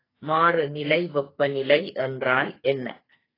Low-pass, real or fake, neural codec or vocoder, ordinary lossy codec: 5.4 kHz; fake; codec, 44.1 kHz, 2.6 kbps, SNAC; AAC, 24 kbps